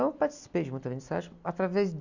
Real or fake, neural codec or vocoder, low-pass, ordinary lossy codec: real; none; 7.2 kHz; none